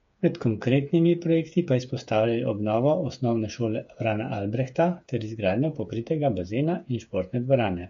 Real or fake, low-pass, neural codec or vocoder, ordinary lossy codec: fake; 7.2 kHz; codec, 16 kHz, 8 kbps, FreqCodec, smaller model; MP3, 48 kbps